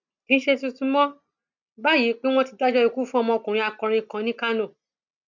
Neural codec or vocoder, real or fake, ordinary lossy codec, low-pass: none; real; none; 7.2 kHz